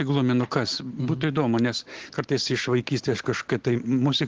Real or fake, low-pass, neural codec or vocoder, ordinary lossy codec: real; 7.2 kHz; none; Opus, 16 kbps